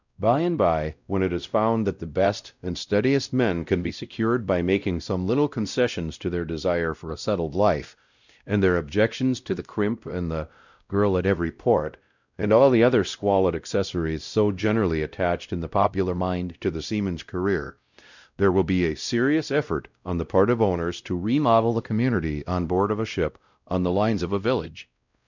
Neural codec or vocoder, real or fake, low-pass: codec, 16 kHz, 0.5 kbps, X-Codec, WavLM features, trained on Multilingual LibriSpeech; fake; 7.2 kHz